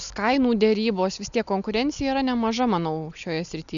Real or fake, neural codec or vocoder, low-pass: real; none; 7.2 kHz